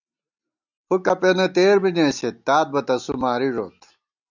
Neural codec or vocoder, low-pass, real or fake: none; 7.2 kHz; real